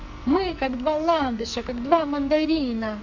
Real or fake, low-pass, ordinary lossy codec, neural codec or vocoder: fake; 7.2 kHz; none; codec, 44.1 kHz, 2.6 kbps, SNAC